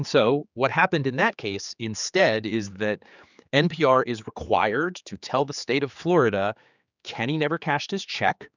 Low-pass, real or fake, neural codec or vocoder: 7.2 kHz; fake; codec, 16 kHz, 4 kbps, X-Codec, HuBERT features, trained on general audio